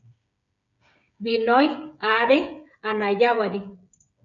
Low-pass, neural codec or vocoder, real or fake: 7.2 kHz; codec, 16 kHz, 8 kbps, FreqCodec, smaller model; fake